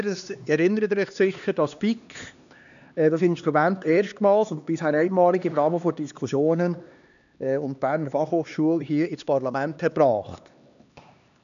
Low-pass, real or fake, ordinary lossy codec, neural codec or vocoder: 7.2 kHz; fake; none; codec, 16 kHz, 2 kbps, X-Codec, HuBERT features, trained on LibriSpeech